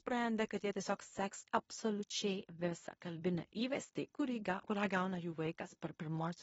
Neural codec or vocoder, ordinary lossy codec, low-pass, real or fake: codec, 16 kHz in and 24 kHz out, 0.9 kbps, LongCat-Audio-Codec, fine tuned four codebook decoder; AAC, 24 kbps; 10.8 kHz; fake